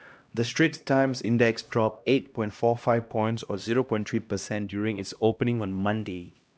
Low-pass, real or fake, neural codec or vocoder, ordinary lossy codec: none; fake; codec, 16 kHz, 1 kbps, X-Codec, HuBERT features, trained on LibriSpeech; none